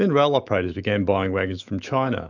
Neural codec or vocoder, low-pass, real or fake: autoencoder, 48 kHz, 128 numbers a frame, DAC-VAE, trained on Japanese speech; 7.2 kHz; fake